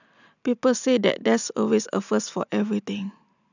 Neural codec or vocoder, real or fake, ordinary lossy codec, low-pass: none; real; none; 7.2 kHz